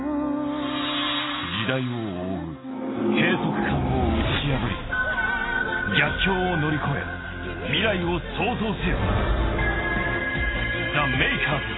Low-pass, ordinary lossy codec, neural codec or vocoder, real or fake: 7.2 kHz; AAC, 16 kbps; none; real